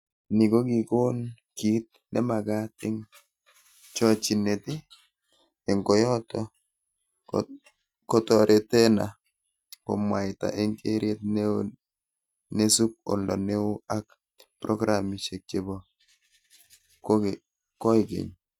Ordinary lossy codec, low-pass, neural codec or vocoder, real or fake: none; 19.8 kHz; none; real